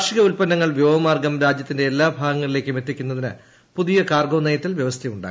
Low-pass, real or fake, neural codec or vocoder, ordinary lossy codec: none; real; none; none